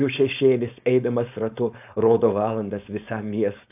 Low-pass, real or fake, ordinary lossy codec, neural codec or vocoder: 3.6 kHz; fake; AAC, 32 kbps; codec, 16 kHz, 4.8 kbps, FACodec